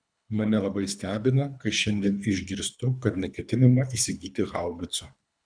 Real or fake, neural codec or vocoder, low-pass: fake; codec, 24 kHz, 3 kbps, HILCodec; 9.9 kHz